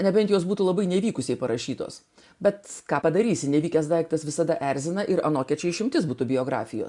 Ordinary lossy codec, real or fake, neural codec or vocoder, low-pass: MP3, 96 kbps; real; none; 10.8 kHz